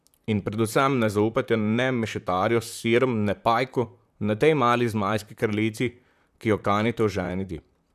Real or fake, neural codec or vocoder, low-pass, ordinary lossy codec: fake; vocoder, 44.1 kHz, 128 mel bands, Pupu-Vocoder; 14.4 kHz; none